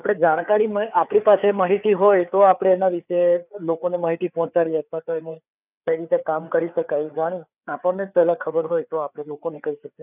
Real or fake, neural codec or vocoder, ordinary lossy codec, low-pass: fake; codec, 16 kHz, 4 kbps, FreqCodec, larger model; none; 3.6 kHz